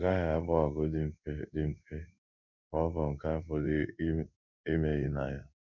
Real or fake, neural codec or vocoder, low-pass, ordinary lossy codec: fake; codec, 16 kHz in and 24 kHz out, 1 kbps, XY-Tokenizer; 7.2 kHz; none